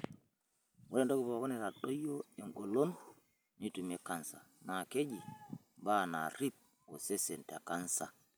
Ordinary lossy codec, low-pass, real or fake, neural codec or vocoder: none; none; real; none